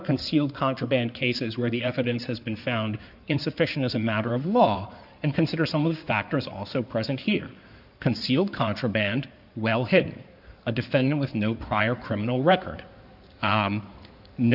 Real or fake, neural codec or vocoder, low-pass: fake; codec, 16 kHz in and 24 kHz out, 2.2 kbps, FireRedTTS-2 codec; 5.4 kHz